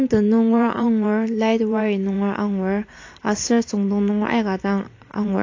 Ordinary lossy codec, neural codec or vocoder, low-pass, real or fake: AAC, 48 kbps; vocoder, 44.1 kHz, 128 mel bands every 512 samples, BigVGAN v2; 7.2 kHz; fake